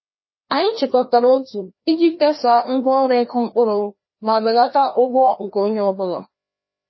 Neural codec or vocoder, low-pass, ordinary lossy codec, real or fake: codec, 16 kHz, 1 kbps, FreqCodec, larger model; 7.2 kHz; MP3, 24 kbps; fake